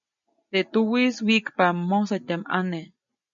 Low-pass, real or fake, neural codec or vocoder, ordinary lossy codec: 7.2 kHz; real; none; MP3, 96 kbps